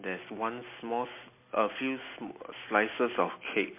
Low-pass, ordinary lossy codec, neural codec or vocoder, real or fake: 3.6 kHz; MP3, 24 kbps; none; real